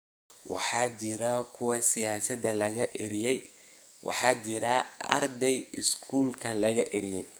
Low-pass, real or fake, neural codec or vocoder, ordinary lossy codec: none; fake; codec, 44.1 kHz, 2.6 kbps, SNAC; none